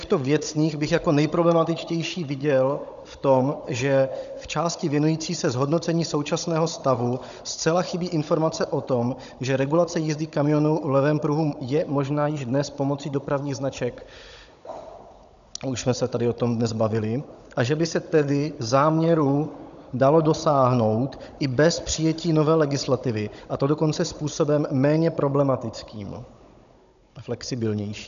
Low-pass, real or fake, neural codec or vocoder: 7.2 kHz; fake; codec, 16 kHz, 16 kbps, FunCodec, trained on Chinese and English, 50 frames a second